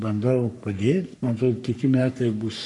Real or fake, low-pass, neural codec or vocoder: fake; 10.8 kHz; codec, 44.1 kHz, 3.4 kbps, Pupu-Codec